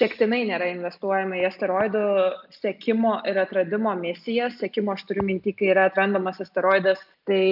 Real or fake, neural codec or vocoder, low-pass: real; none; 5.4 kHz